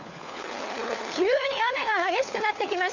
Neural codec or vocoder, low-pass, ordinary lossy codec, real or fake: codec, 16 kHz, 4 kbps, FunCodec, trained on LibriTTS, 50 frames a second; 7.2 kHz; none; fake